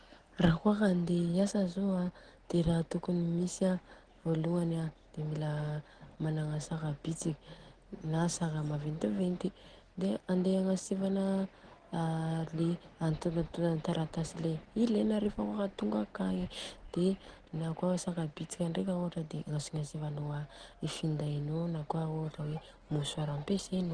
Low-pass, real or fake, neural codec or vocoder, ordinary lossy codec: 9.9 kHz; real; none; Opus, 16 kbps